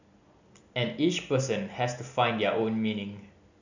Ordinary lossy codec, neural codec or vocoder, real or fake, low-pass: none; none; real; 7.2 kHz